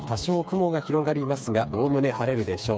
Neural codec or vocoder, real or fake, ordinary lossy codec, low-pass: codec, 16 kHz, 4 kbps, FreqCodec, smaller model; fake; none; none